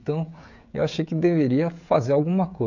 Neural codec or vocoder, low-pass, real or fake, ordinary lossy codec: codec, 16 kHz, 16 kbps, FreqCodec, smaller model; 7.2 kHz; fake; none